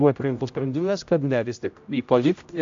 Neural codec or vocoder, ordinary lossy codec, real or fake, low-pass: codec, 16 kHz, 0.5 kbps, X-Codec, HuBERT features, trained on general audio; AAC, 64 kbps; fake; 7.2 kHz